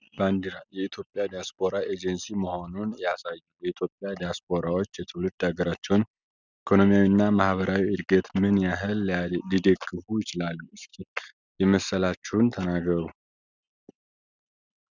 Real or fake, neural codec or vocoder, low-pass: real; none; 7.2 kHz